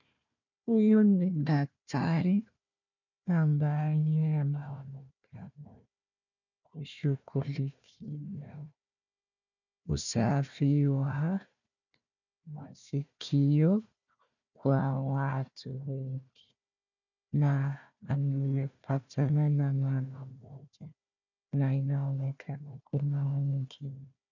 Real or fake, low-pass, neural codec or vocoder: fake; 7.2 kHz; codec, 16 kHz, 1 kbps, FunCodec, trained on Chinese and English, 50 frames a second